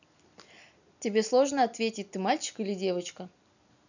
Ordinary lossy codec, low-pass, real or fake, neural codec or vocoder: none; 7.2 kHz; real; none